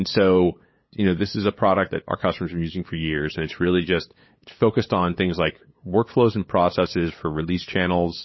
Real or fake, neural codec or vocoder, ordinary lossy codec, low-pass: fake; codec, 16 kHz, 8 kbps, FunCodec, trained on LibriTTS, 25 frames a second; MP3, 24 kbps; 7.2 kHz